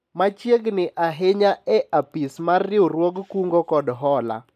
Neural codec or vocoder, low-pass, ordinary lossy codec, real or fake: none; 14.4 kHz; none; real